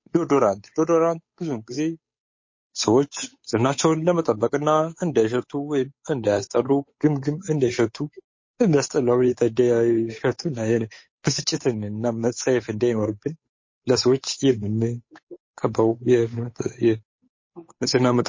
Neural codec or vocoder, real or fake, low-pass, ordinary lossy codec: codec, 16 kHz, 8 kbps, FunCodec, trained on Chinese and English, 25 frames a second; fake; 7.2 kHz; MP3, 32 kbps